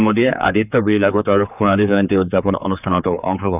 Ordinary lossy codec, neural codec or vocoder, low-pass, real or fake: none; codec, 16 kHz, 4 kbps, X-Codec, HuBERT features, trained on general audio; 3.6 kHz; fake